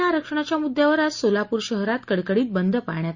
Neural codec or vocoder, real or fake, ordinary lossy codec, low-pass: none; real; Opus, 64 kbps; 7.2 kHz